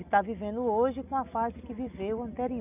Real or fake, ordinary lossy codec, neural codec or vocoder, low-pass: real; none; none; 3.6 kHz